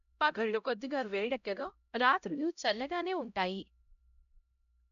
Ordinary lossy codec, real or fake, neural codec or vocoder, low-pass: none; fake; codec, 16 kHz, 0.5 kbps, X-Codec, HuBERT features, trained on LibriSpeech; 7.2 kHz